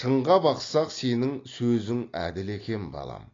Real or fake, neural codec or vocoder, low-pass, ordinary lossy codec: real; none; 7.2 kHz; AAC, 32 kbps